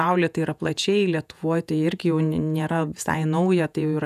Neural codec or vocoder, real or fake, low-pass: vocoder, 48 kHz, 128 mel bands, Vocos; fake; 14.4 kHz